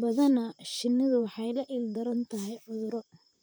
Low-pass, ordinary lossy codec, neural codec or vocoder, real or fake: none; none; vocoder, 44.1 kHz, 128 mel bands, Pupu-Vocoder; fake